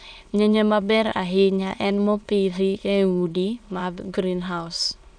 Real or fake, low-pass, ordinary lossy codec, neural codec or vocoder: fake; 9.9 kHz; none; autoencoder, 22.05 kHz, a latent of 192 numbers a frame, VITS, trained on many speakers